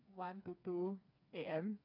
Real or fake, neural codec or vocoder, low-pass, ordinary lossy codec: fake; codec, 16 kHz, 4 kbps, FreqCodec, smaller model; 5.4 kHz; none